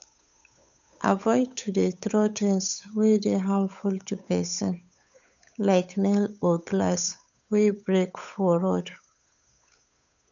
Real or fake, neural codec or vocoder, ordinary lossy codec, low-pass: fake; codec, 16 kHz, 8 kbps, FunCodec, trained on Chinese and English, 25 frames a second; none; 7.2 kHz